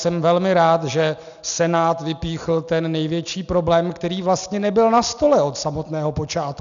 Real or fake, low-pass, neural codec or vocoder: real; 7.2 kHz; none